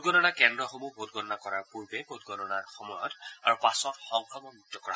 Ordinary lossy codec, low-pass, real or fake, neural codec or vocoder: none; none; real; none